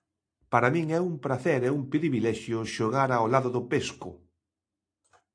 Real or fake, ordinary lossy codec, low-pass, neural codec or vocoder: real; AAC, 48 kbps; 9.9 kHz; none